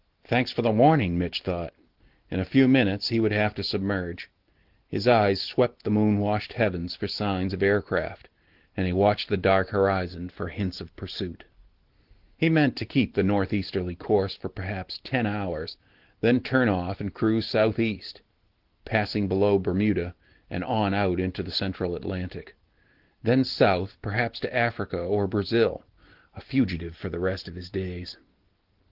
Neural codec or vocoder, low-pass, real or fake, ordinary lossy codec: none; 5.4 kHz; real; Opus, 16 kbps